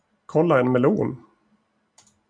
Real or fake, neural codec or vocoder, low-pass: real; none; 9.9 kHz